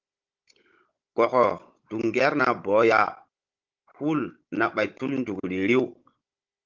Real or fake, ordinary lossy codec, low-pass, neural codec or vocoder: fake; Opus, 24 kbps; 7.2 kHz; codec, 16 kHz, 16 kbps, FunCodec, trained on Chinese and English, 50 frames a second